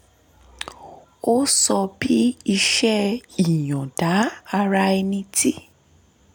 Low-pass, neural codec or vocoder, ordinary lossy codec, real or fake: none; none; none; real